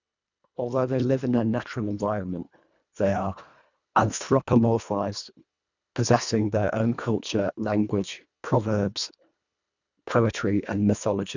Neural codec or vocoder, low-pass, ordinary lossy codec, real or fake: codec, 24 kHz, 1.5 kbps, HILCodec; 7.2 kHz; none; fake